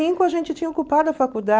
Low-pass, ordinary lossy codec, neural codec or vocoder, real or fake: none; none; none; real